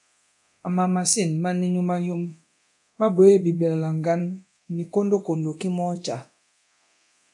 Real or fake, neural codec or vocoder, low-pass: fake; codec, 24 kHz, 0.9 kbps, DualCodec; 10.8 kHz